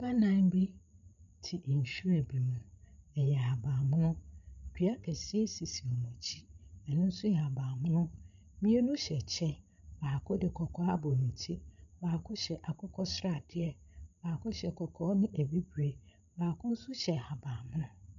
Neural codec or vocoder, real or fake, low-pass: codec, 16 kHz, 16 kbps, FreqCodec, larger model; fake; 7.2 kHz